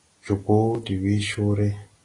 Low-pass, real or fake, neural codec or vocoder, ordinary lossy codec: 10.8 kHz; real; none; AAC, 32 kbps